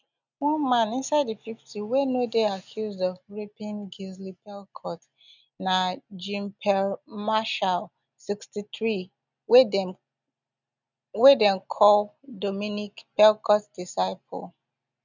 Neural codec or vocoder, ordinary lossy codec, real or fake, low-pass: none; none; real; 7.2 kHz